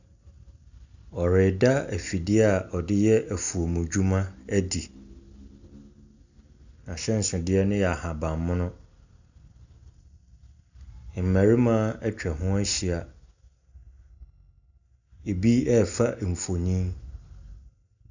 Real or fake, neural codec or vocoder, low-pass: real; none; 7.2 kHz